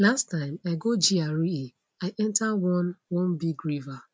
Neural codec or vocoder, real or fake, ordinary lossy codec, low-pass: none; real; none; none